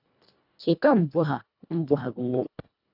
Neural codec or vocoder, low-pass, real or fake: codec, 24 kHz, 1.5 kbps, HILCodec; 5.4 kHz; fake